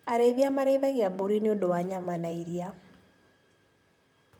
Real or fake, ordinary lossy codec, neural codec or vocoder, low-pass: fake; MP3, 96 kbps; vocoder, 44.1 kHz, 128 mel bands, Pupu-Vocoder; 19.8 kHz